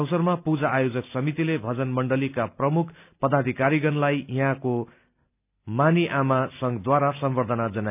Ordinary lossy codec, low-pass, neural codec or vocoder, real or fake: none; 3.6 kHz; none; real